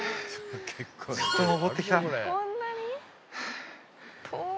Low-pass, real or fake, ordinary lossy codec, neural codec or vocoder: none; real; none; none